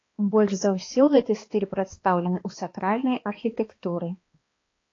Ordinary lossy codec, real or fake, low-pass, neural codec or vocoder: AAC, 32 kbps; fake; 7.2 kHz; codec, 16 kHz, 2 kbps, X-Codec, HuBERT features, trained on balanced general audio